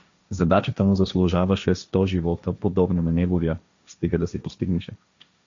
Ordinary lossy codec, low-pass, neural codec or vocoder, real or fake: MP3, 64 kbps; 7.2 kHz; codec, 16 kHz, 1.1 kbps, Voila-Tokenizer; fake